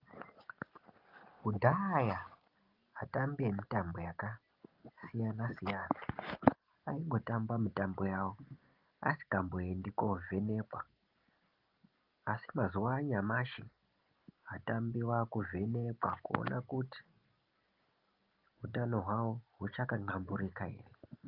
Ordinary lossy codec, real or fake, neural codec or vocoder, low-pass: Opus, 32 kbps; real; none; 5.4 kHz